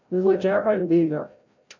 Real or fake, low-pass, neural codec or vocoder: fake; 7.2 kHz; codec, 16 kHz, 0.5 kbps, FreqCodec, larger model